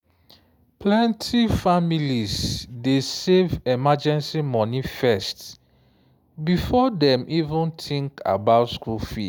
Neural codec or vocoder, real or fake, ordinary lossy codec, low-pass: none; real; none; none